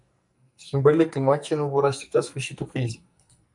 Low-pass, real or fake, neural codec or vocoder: 10.8 kHz; fake; codec, 44.1 kHz, 2.6 kbps, SNAC